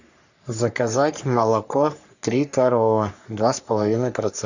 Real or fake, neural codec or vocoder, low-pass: fake; codec, 44.1 kHz, 3.4 kbps, Pupu-Codec; 7.2 kHz